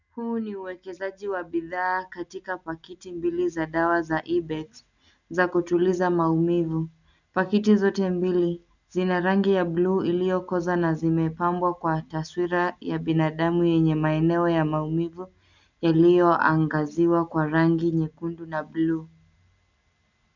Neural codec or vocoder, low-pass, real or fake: none; 7.2 kHz; real